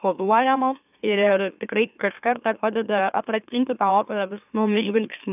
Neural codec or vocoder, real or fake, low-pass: autoencoder, 44.1 kHz, a latent of 192 numbers a frame, MeloTTS; fake; 3.6 kHz